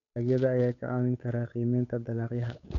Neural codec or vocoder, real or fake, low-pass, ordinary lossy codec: codec, 16 kHz, 8 kbps, FunCodec, trained on Chinese and English, 25 frames a second; fake; 7.2 kHz; none